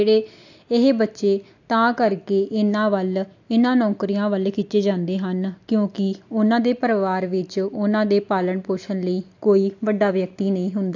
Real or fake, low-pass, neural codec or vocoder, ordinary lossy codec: real; 7.2 kHz; none; AAC, 48 kbps